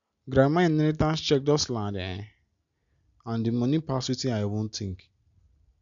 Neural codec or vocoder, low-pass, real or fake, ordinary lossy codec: none; 7.2 kHz; real; none